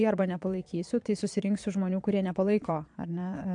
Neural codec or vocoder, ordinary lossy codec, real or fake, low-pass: vocoder, 22.05 kHz, 80 mel bands, Vocos; AAC, 64 kbps; fake; 9.9 kHz